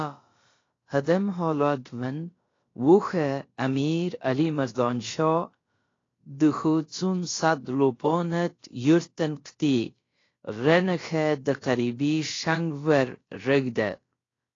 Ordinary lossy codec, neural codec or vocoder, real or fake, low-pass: AAC, 32 kbps; codec, 16 kHz, about 1 kbps, DyCAST, with the encoder's durations; fake; 7.2 kHz